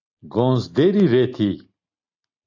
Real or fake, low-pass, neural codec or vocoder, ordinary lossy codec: real; 7.2 kHz; none; AAC, 48 kbps